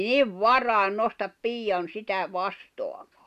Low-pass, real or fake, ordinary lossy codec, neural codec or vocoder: 14.4 kHz; real; none; none